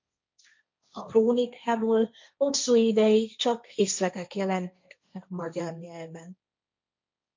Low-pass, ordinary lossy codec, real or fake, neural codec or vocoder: 7.2 kHz; MP3, 48 kbps; fake; codec, 16 kHz, 1.1 kbps, Voila-Tokenizer